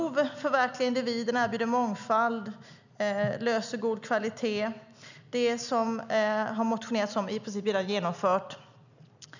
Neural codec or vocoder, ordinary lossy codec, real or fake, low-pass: none; none; real; 7.2 kHz